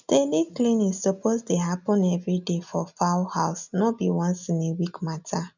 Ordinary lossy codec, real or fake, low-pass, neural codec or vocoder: none; real; 7.2 kHz; none